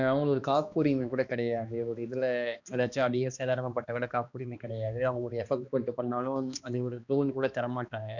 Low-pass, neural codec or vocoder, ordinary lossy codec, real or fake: 7.2 kHz; codec, 16 kHz, 2 kbps, X-Codec, HuBERT features, trained on balanced general audio; none; fake